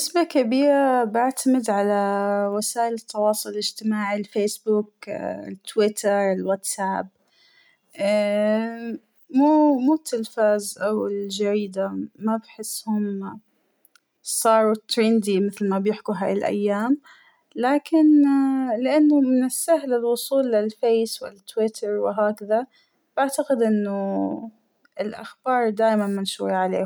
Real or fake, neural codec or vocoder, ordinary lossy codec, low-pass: real; none; none; none